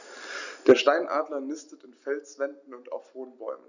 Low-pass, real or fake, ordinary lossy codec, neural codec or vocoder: 7.2 kHz; real; none; none